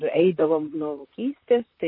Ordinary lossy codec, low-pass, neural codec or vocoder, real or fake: MP3, 24 kbps; 5.4 kHz; vocoder, 44.1 kHz, 128 mel bands, Pupu-Vocoder; fake